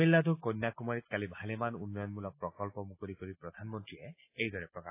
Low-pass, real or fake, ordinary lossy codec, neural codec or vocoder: 3.6 kHz; real; AAC, 24 kbps; none